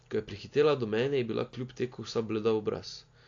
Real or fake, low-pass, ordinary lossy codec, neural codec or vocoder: real; 7.2 kHz; AAC, 48 kbps; none